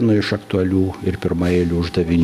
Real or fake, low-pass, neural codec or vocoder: real; 14.4 kHz; none